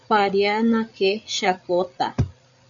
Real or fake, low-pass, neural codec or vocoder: fake; 7.2 kHz; codec, 16 kHz, 8 kbps, FreqCodec, larger model